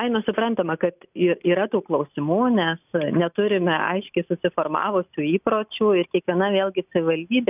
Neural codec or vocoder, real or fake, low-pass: none; real; 3.6 kHz